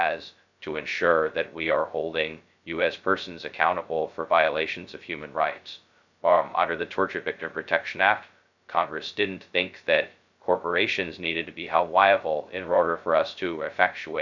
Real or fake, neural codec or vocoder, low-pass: fake; codec, 16 kHz, 0.2 kbps, FocalCodec; 7.2 kHz